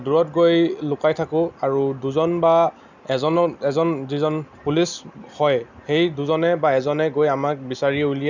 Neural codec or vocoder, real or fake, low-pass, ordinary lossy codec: none; real; 7.2 kHz; none